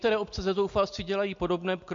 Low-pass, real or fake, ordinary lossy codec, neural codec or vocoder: 7.2 kHz; real; MP3, 48 kbps; none